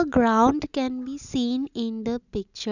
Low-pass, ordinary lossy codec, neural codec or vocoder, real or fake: 7.2 kHz; none; none; real